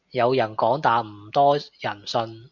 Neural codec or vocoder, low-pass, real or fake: none; 7.2 kHz; real